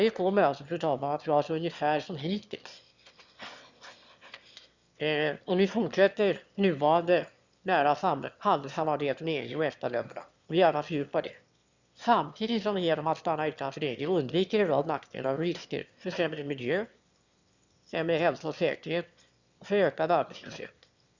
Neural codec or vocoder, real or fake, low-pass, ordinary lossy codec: autoencoder, 22.05 kHz, a latent of 192 numbers a frame, VITS, trained on one speaker; fake; 7.2 kHz; Opus, 64 kbps